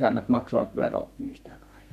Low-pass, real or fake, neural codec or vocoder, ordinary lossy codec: 14.4 kHz; fake; codec, 32 kHz, 1.9 kbps, SNAC; none